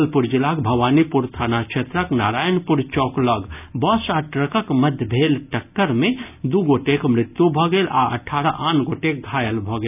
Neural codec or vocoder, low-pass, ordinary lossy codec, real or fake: none; 3.6 kHz; none; real